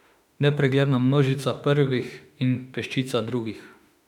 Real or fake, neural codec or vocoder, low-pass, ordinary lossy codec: fake; autoencoder, 48 kHz, 32 numbers a frame, DAC-VAE, trained on Japanese speech; 19.8 kHz; none